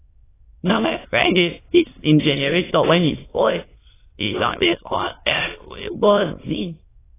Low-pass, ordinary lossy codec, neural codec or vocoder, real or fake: 3.6 kHz; AAC, 16 kbps; autoencoder, 22.05 kHz, a latent of 192 numbers a frame, VITS, trained on many speakers; fake